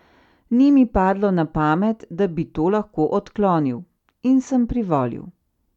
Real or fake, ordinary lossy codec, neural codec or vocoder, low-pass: real; none; none; 19.8 kHz